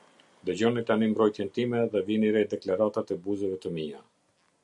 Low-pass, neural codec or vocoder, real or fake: 10.8 kHz; none; real